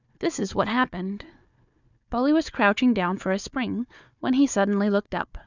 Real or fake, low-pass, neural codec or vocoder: fake; 7.2 kHz; codec, 16 kHz, 4 kbps, FunCodec, trained on Chinese and English, 50 frames a second